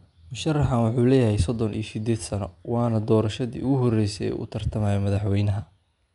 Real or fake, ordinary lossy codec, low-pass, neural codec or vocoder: real; MP3, 96 kbps; 10.8 kHz; none